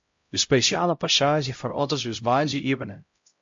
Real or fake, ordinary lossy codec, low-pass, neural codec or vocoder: fake; MP3, 48 kbps; 7.2 kHz; codec, 16 kHz, 0.5 kbps, X-Codec, HuBERT features, trained on LibriSpeech